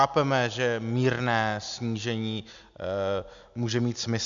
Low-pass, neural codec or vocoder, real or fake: 7.2 kHz; none; real